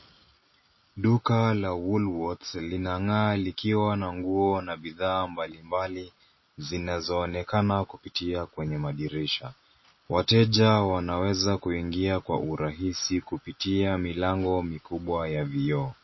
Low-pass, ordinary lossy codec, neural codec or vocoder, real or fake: 7.2 kHz; MP3, 24 kbps; none; real